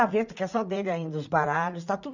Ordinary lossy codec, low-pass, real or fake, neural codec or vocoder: none; 7.2 kHz; fake; vocoder, 44.1 kHz, 80 mel bands, Vocos